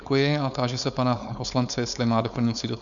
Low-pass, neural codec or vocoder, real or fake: 7.2 kHz; codec, 16 kHz, 4.8 kbps, FACodec; fake